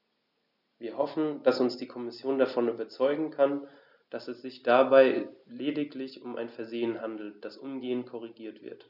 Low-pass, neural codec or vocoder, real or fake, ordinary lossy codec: 5.4 kHz; none; real; none